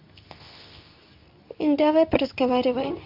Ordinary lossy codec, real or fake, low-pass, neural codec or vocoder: none; fake; 5.4 kHz; codec, 24 kHz, 0.9 kbps, WavTokenizer, medium speech release version 2